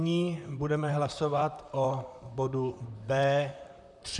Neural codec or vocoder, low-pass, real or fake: vocoder, 44.1 kHz, 128 mel bands, Pupu-Vocoder; 10.8 kHz; fake